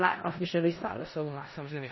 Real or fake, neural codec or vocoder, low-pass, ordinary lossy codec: fake; codec, 16 kHz in and 24 kHz out, 0.4 kbps, LongCat-Audio-Codec, four codebook decoder; 7.2 kHz; MP3, 24 kbps